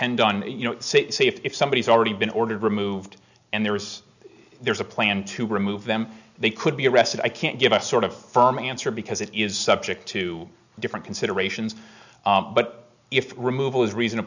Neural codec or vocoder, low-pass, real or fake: none; 7.2 kHz; real